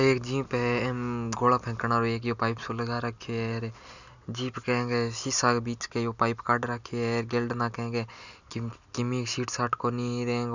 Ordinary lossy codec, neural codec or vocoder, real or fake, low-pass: none; none; real; 7.2 kHz